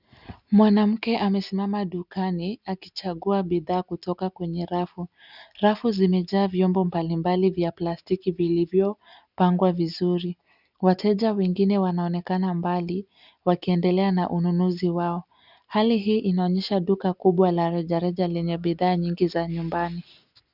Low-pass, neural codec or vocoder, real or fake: 5.4 kHz; none; real